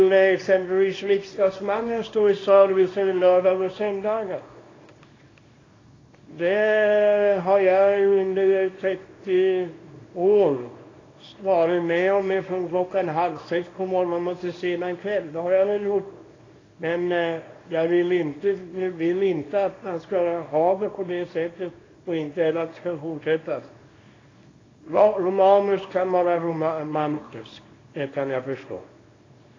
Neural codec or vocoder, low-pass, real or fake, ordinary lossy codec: codec, 24 kHz, 0.9 kbps, WavTokenizer, small release; 7.2 kHz; fake; AAC, 32 kbps